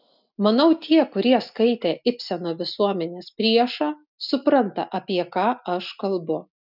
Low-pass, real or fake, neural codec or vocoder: 5.4 kHz; real; none